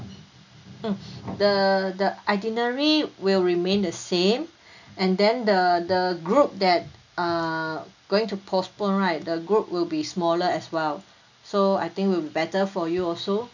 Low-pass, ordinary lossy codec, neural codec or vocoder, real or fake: 7.2 kHz; none; none; real